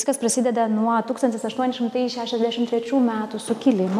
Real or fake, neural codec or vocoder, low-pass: real; none; 14.4 kHz